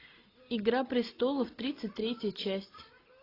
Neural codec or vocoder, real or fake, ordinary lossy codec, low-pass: none; real; AAC, 24 kbps; 5.4 kHz